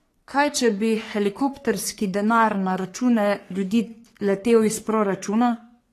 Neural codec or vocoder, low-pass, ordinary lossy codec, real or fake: codec, 44.1 kHz, 3.4 kbps, Pupu-Codec; 14.4 kHz; AAC, 48 kbps; fake